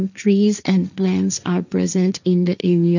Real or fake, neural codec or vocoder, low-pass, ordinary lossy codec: fake; codec, 16 kHz, 1.1 kbps, Voila-Tokenizer; 7.2 kHz; none